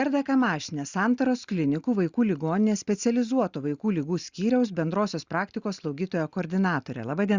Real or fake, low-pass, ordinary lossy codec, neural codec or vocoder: real; 7.2 kHz; Opus, 64 kbps; none